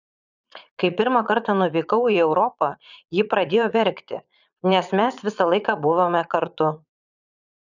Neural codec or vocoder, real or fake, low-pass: vocoder, 22.05 kHz, 80 mel bands, Vocos; fake; 7.2 kHz